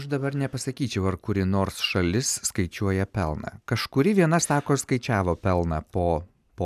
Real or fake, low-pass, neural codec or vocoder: real; 14.4 kHz; none